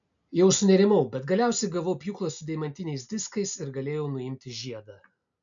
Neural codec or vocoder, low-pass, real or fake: none; 7.2 kHz; real